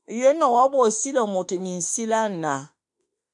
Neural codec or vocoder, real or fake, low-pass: autoencoder, 48 kHz, 32 numbers a frame, DAC-VAE, trained on Japanese speech; fake; 10.8 kHz